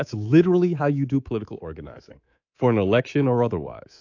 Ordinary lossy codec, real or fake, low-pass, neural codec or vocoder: AAC, 48 kbps; fake; 7.2 kHz; codec, 24 kHz, 3.1 kbps, DualCodec